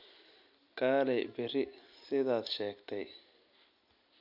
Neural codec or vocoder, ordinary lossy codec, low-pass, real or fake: none; none; 5.4 kHz; real